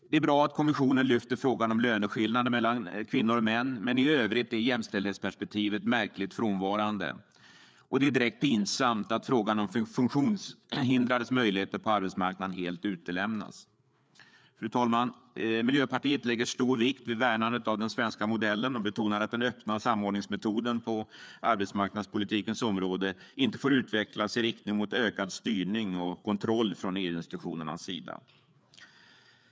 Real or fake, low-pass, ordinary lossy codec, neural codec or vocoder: fake; none; none; codec, 16 kHz, 4 kbps, FreqCodec, larger model